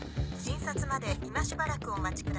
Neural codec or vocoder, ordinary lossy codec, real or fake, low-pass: none; none; real; none